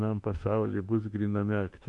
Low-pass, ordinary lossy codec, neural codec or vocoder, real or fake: 10.8 kHz; MP3, 64 kbps; autoencoder, 48 kHz, 32 numbers a frame, DAC-VAE, trained on Japanese speech; fake